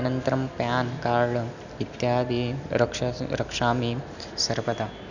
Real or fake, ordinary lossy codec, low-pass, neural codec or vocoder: real; none; 7.2 kHz; none